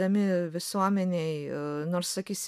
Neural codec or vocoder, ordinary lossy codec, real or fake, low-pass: none; MP3, 96 kbps; real; 14.4 kHz